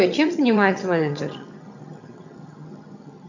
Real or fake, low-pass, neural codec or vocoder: fake; 7.2 kHz; vocoder, 22.05 kHz, 80 mel bands, HiFi-GAN